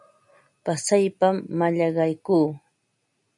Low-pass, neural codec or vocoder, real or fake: 10.8 kHz; none; real